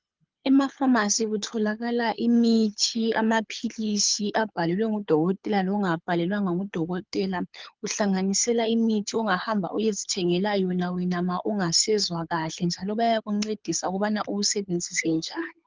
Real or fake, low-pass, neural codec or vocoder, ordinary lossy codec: fake; 7.2 kHz; codec, 24 kHz, 6 kbps, HILCodec; Opus, 32 kbps